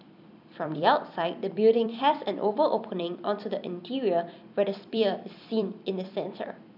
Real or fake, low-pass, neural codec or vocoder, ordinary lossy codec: fake; 5.4 kHz; vocoder, 44.1 kHz, 128 mel bands every 256 samples, BigVGAN v2; none